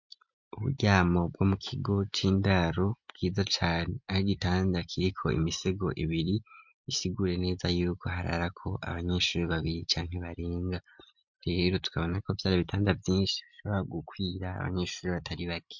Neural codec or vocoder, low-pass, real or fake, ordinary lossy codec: none; 7.2 kHz; real; MP3, 64 kbps